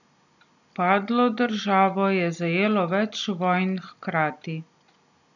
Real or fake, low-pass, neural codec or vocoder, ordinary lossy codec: real; 7.2 kHz; none; none